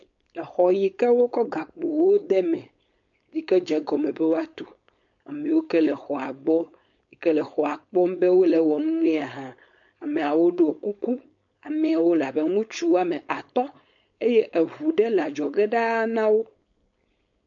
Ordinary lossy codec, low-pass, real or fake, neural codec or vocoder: MP3, 48 kbps; 7.2 kHz; fake; codec, 16 kHz, 4.8 kbps, FACodec